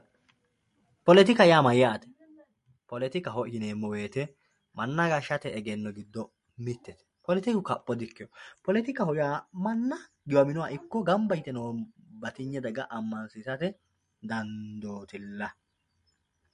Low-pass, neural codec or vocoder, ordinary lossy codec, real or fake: 10.8 kHz; none; MP3, 48 kbps; real